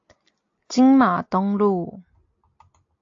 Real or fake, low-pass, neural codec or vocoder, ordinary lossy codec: real; 7.2 kHz; none; AAC, 48 kbps